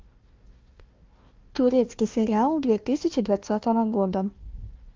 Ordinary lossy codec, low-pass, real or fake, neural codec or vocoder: Opus, 32 kbps; 7.2 kHz; fake; codec, 16 kHz, 1 kbps, FunCodec, trained on Chinese and English, 50 frames a second